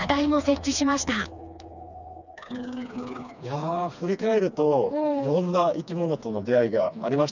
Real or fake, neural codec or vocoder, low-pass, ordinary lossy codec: fake; codec, 16 kHz, 2 kbps, FreqCodec, smaller model; 7.2 kHz; none